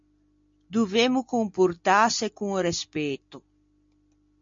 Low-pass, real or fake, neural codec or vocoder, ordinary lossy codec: 7.2 kHz; real; none; MP3, 48 kbps